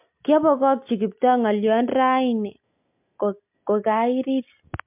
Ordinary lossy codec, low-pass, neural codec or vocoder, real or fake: MP3, 32 kbps; 3.6 kHz; none; real